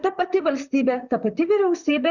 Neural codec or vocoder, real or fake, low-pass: vocoder, 44.1 kHz, 128 mel bands, Pupu-Vocoder; fake; 7.2 kHz